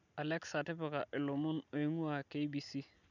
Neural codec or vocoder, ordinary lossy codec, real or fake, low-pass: none; none; real; 7.2 kHz